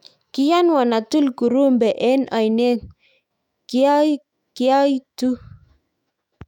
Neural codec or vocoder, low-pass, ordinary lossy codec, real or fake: autoencoder, 48 kHz, 128 numbers a frame, DAC-VAE, trained on Japanese speech; 19.8 kHz; none; fake